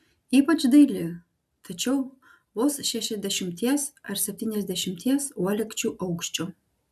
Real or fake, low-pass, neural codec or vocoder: real; 14.4 kHz; none